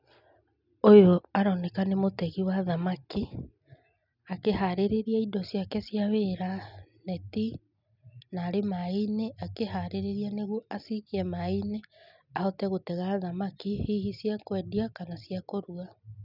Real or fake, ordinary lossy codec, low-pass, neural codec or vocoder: real; none; 5.4 kHz; none